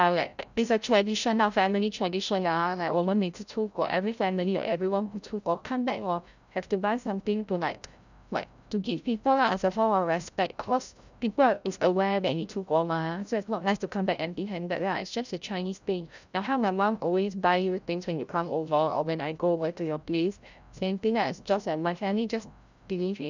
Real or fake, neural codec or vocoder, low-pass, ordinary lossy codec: fake; codec, 16 kHz, 0.5 kbps, FreqCodec, larger model; 7.2 kHz; none